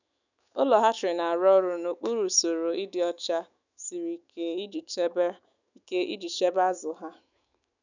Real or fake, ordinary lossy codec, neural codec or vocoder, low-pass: fake; none; autoencoder, 48 kHz, 128 numbers a frame, DAC-VAE, trained on Japanese speech; 7.2 kHz